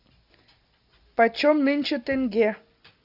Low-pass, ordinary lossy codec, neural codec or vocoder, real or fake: 5.4 kHz; Opus, 64 kbps; none; real